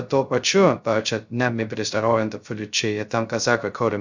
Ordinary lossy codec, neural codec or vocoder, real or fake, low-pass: Opus, 64 kbps; codec, 16 kHz, 0.2 kbps, FocalCodec; fake; 7.2 kHz